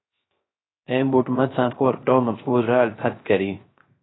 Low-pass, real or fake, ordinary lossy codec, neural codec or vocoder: 7.2 kHz; fake; AAC, 16 kbps; codec, 16 kHz, 0.3 kbps, FocalCodec